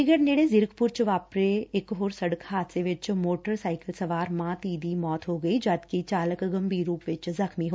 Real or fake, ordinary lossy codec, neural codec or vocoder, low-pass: real; none; none; none